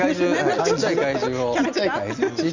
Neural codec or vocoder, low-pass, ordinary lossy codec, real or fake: none; 7.2 kHz; Opus, 64 kbps; real